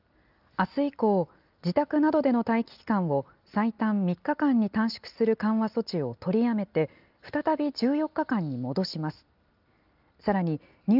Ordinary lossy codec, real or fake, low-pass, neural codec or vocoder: Opus, 24 kbps; real; 5.4 kHz; none